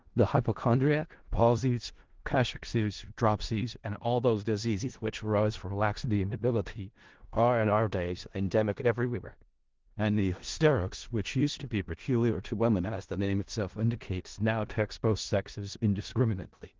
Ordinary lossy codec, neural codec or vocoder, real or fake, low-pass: Opus, 16 kbps; codec, 16 kHz in and 24 kHz out, 0.4 kbps, LongCat-Audio-Codec, four codebook decoder; fake; 7.2 kHz